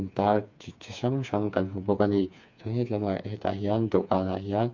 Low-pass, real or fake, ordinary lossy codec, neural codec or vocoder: 7.2 kHz; fake; MP3, 64 kbps; codec, 16 kHz, 4 kbps, FreqCodec, smaller model